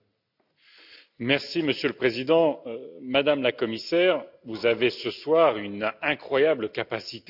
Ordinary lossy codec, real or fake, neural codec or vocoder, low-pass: none; real; none; 5.4 kHz